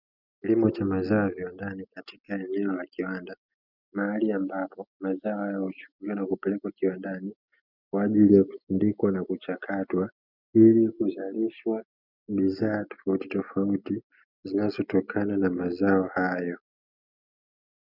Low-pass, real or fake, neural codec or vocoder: 5.4 kHz; real; none